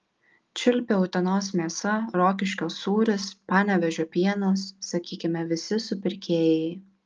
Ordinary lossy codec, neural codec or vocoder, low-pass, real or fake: Opus, 24 kbps; none; 7.2 kHz; real